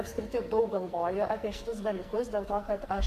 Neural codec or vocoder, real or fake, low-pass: codec, 44.1 kHz, 2.6 kbps, SNAC; fake; 14.4 kHz